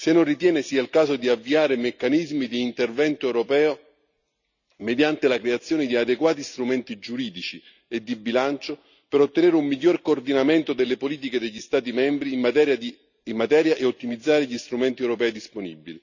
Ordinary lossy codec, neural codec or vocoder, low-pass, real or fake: none; none; 7.2 kHz; real